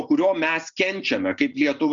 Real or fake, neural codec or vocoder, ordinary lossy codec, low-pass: real; none; Opus, 64 kbps; 7.2 kHz